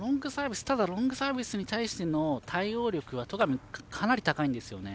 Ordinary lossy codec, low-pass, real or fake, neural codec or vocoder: none; none; real; none